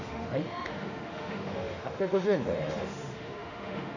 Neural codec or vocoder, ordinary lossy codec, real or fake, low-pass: autoencoder, 48 kHz, 32 numbers a frame, DAC-VAE, trained on Japanese speech; none; fake; 7.2 kHz